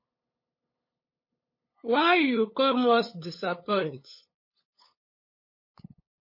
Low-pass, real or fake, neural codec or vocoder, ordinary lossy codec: 5.4 kHz; fake; codec, 16 kHz, 8 kbps, FunCodec, trained on LibriTTS, 25 frames a second; MP3, 24 kbps